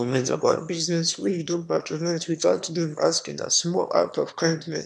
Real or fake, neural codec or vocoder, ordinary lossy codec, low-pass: fake; autoencoder, 22.05 kHz, a latent of 192 numbers a frame, VITS, trained on one speaker; none; none